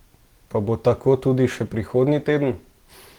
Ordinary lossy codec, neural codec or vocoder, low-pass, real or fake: Opus, 16 kbps; none; 19.8 kHz; real